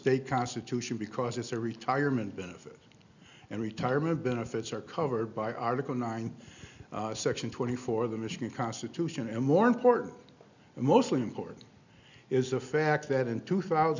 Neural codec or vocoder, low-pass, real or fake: none; 7.2 kHz; real